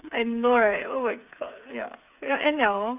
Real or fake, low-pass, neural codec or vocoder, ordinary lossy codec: fake; 3.6 kHz; codec, 16 kHz, 8 kbps, FreqCodec, smaller model; none